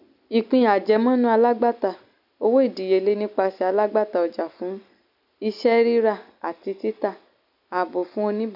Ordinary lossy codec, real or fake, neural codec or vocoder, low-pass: none; real; none; 5.4 kHz